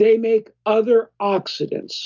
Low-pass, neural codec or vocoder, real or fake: 7.2 kHz; none; real